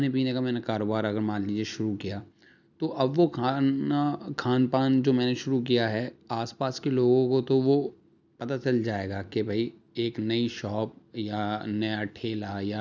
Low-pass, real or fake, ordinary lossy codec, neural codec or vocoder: 7.2 kHz; real; none; none